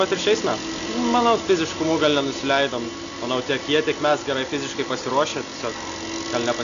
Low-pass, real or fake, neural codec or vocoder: 7.2 kHz; real; none